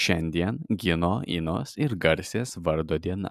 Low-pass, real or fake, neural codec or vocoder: 14.4 kHz; real; none